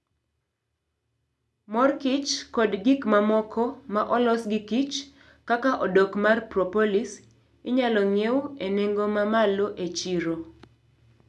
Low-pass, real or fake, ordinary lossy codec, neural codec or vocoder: none; real; none; none